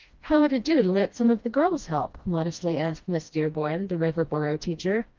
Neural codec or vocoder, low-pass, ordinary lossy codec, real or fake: codec, 16 kHz, 1 kbps, FreqCodec, smaller model; 7.2 kHz; Opus, 32 kbps; fake